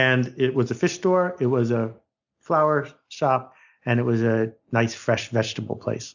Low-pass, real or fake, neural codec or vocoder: 7.2 kHz; real; none